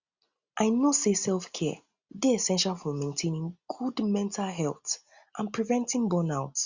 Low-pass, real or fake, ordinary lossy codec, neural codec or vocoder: 7.2 kHz; real; Opus, 64 kbps; none